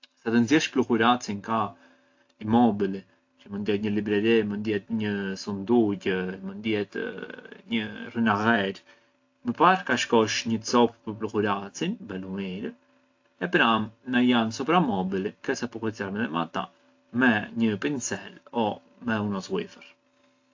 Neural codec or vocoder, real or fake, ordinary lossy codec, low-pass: none; real; AAC, 48 kbps; 7.2 kHz